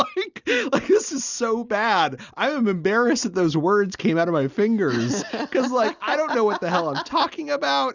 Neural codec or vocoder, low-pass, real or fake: none; 7.2 kHz; real